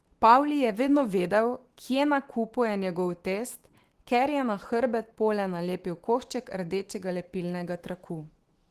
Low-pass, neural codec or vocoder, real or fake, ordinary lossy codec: 14.4 kHz; autoencoder, 48 kHz, 128 numbers a frame, DAC-VAE, trained on Japanese speech; fake; Opus, 16 kbps